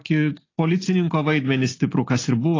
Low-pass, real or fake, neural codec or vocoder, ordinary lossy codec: 7.2 kHz; real; none; AAC, 32 kbps